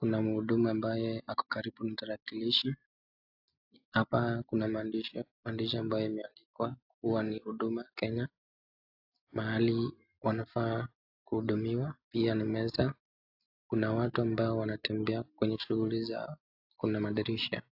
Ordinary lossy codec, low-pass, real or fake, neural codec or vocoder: AAC, 48 kbps; 5.4 kHz; real; none